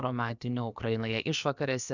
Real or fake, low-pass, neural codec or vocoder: fake; 7.2 kHz; codec, 16 kHz, about 1 kbps, DyCAST, with the encoder's durations